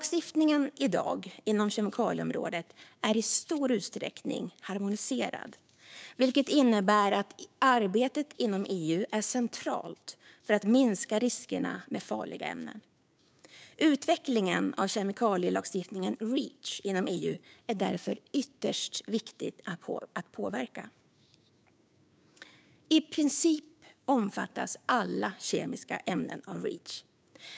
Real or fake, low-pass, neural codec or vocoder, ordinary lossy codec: fake; none; codec, 16 kHz, 6 kbps, DAC; none